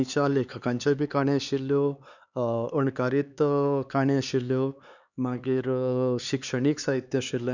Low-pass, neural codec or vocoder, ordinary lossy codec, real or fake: 7.2 kHz; codec, 16 kHz, 4 kbps, X-Codec, HuBERT features, trained on LibriSpeech; none; fake